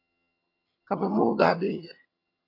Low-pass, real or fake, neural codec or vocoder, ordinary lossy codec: 5.4 kHz; fake; vocoder, 22.05 kHz, 80 mel bands, HiFi-GAN; AAC, 24 kbps